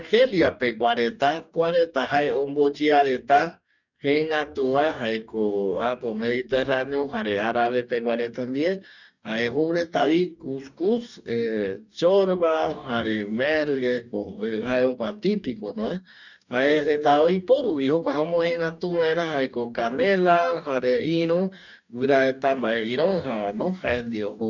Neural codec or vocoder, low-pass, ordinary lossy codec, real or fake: codec, 44.1 kHz, 2.6 kbps, DAC; 7.2 kHz; none; fake